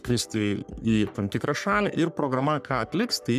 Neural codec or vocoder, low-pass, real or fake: codec, 44.1 kHz, 3.4 kbps, Pupu-Codec; 14.4 kHz; fake